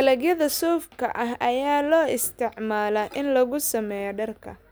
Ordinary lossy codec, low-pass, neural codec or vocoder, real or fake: none; none; none; real